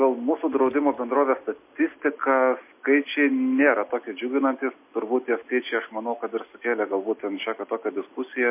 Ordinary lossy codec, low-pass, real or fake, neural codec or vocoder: MP3, 24 kbps; 3.6 kHz; real; none